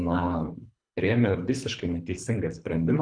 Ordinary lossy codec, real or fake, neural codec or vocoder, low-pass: MP3, 96 kbps; fake; codec, 24 kHz, 3 kbps, HILCodec; 9.9 kHz